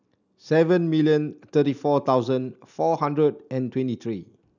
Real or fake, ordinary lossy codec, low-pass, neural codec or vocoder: real; none; 7.2 kHz; none